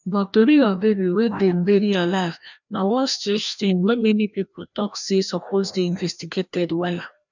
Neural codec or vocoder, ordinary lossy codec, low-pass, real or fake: codec, 16 kHz, 1 kbps, FreqCodec, larger model; none; 7.2 kHz; fake